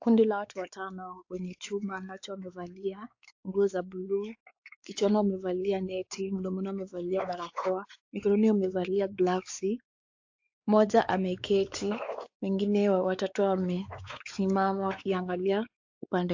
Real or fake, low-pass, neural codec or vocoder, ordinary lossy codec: fake; 7.2 kHz; codec, 16 kHz, 4 kbps, X-Codec, WavLM features, trained on Multilingual LibriSpeech; AAC, 48 kbps